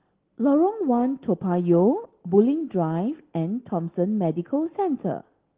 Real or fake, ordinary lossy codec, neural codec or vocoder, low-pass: real; Opus, 16 kbps; none; 3.6 kHz